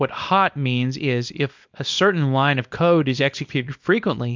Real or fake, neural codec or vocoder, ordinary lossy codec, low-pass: fake; codec, 24 kHz, 0.9 kbps, WavTokenizer, small release; MP3, 64 kbps; 7.2 kHz